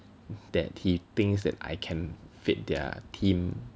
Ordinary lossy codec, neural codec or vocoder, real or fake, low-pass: none; none; real; none